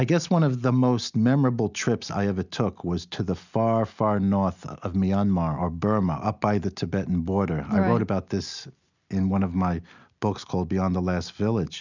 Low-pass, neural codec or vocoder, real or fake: 7.2 kHz; none; real